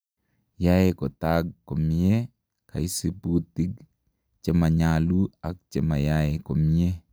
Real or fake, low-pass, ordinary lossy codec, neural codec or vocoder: real; none; none; none